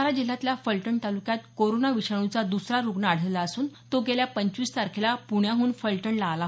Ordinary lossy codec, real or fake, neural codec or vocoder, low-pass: none; real; none; none